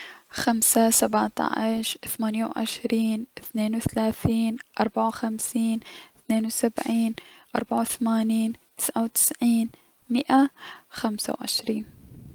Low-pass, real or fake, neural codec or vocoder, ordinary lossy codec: 19.8 kHz; real; none; Opus, 32 kbps